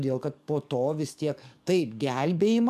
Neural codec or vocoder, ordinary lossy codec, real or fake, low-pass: codec, 44.1 kHz, 7.8 kbps, DAC; MP3, 96 kbps; fake; 14.4 kHz